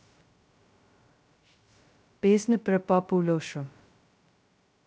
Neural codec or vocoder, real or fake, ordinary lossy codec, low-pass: codec, 16 kHz, 0.2 kbps, FocalCodec; fake; none; none